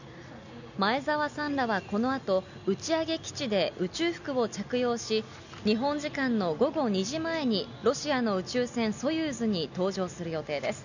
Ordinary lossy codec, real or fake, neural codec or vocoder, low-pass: none; real; none; 7.2 kHz